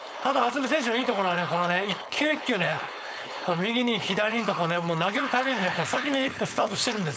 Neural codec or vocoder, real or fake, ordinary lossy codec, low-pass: codec, 16 kHz, 4.8 kbps, FACodec; fake; none; none